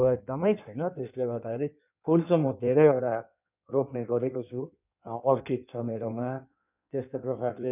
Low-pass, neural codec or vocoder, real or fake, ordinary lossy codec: 3.6 kHz; codec, 16 kHz in and 24 kHz out, 1.1 kbps, FireRedTTS-2 codec; fake; none